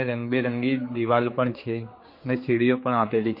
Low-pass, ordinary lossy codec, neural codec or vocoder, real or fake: 5.4 kHz; MP3, 32 kbps; codec, 16 kHz, 4 kbps, X-Codec, HuBERT features, trained on general audio; fake